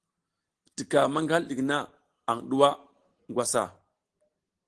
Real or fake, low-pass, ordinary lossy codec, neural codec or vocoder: real; 10.8 kHz; Opus, 16 kbps; none